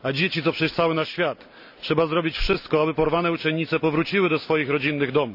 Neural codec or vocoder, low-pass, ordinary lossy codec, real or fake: none; 5.4 kHz; none; real